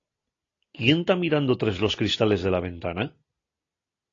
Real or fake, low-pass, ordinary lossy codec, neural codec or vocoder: real; 7.2 kHz; AAC, 32 kbps; none